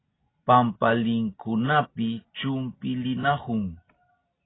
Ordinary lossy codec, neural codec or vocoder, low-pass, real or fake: AAC, 16 kbps; none; 7.2 kHz; real